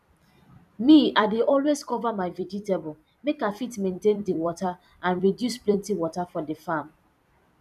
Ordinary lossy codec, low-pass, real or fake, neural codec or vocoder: none; 14.4 kHz; fake; vocoder, 44.1 kHz, 128 mel bands every 256 samples, BigVGAN v2